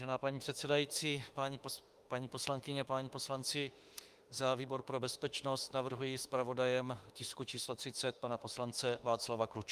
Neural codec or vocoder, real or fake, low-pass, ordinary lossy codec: autoencoder, 48 kHz, 32 numbers a frame, DAC-VAE, trained on Japanese speech; fake; 14.4 kHz; Opus, 32 kbps